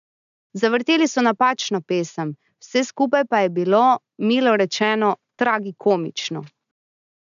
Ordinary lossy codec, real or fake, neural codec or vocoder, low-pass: none; real; none; 7.2 kHz